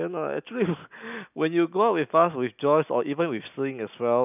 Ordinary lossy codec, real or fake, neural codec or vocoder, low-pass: none; real; none; 3.6 kHz